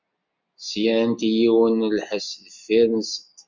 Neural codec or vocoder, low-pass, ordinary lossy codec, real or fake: none; 7.2 kHz; MP3, 64 kbps; real